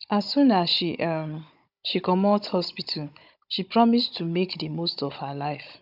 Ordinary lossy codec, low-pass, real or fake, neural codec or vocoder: none; 5.4 kHz; fake; codec, 16 kHz, 16 kbps, FunCodec, trained on Chinese and English, 50 frames a second